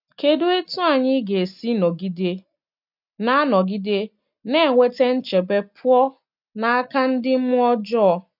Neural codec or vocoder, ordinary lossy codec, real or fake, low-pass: none; none; real; 5.4 kHz